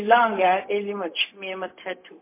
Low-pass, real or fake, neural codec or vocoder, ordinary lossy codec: 3.6 kHz; fake; codec, 16 kHz, 0.4 kbps, LongCat-Audio-Codec; MP3, 24 kbps